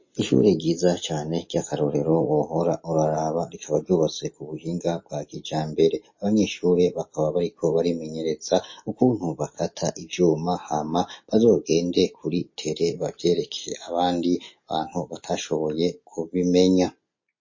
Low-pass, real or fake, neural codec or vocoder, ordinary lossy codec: 7.2 kHz; real; none; MP3, 32 kbps